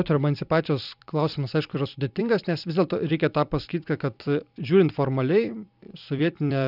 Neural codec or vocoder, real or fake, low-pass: vocoder, 44.1 kHz, 128 mel bands every 256 samples, BigVGAN v2; fake; 5.4 kHz